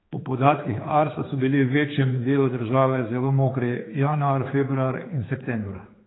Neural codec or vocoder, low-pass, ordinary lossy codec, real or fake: codec, 16 kHz, 4 kbps, X-Codec, HuBERT features, trained on general audio; 7.2 kHz; AAC, 16 kbps; fake